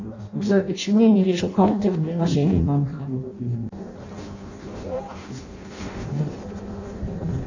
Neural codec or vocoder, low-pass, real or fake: codec, 16 kHz in and 24 kHz out, 0.6 kbps, FireRedTTS-2 codec; 7.2 kHz; fake